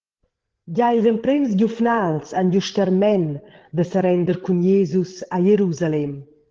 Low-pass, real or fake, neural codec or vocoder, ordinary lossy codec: 7.2 kHz; fake; codec, 16 kHz, 8 kbps, FreqCodec, larger model; Opus, 16 kbps